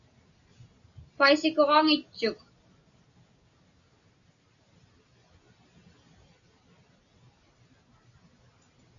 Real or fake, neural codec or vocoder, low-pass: real; none; 7.2 kHz